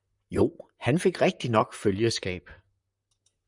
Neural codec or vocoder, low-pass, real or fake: vocoder, 44.1 kHz, 128 mel bands, Pupu-Vocoder; 10.8 kHz; fake